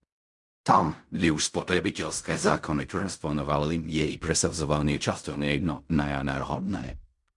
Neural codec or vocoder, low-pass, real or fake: codec, 16 kHz in and 24 kHz out, 0.4 kbps, LongCat-Audio-Codec, fine tuned four codebook decoder; 10.8 kHz; fake